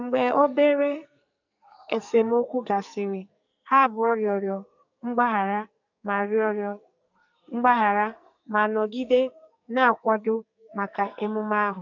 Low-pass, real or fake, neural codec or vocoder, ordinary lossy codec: 7.2 kHz; fake; codec, 44.1 kHz, 2.6 kbps, SNAC; none